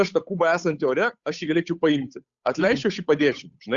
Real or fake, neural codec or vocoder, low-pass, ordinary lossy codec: fake; codec, 16 kHz, 8 kbps, FunCodec, trained on Chinese and English, 25 frames a second; 7.2 kHz; Opus, 64 kbps